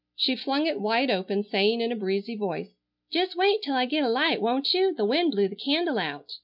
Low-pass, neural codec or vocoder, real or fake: 5.4 kHz; none; real